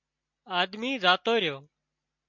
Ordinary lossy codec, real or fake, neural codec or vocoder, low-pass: MP3, 64 kbps; real; none; 7.2 kHz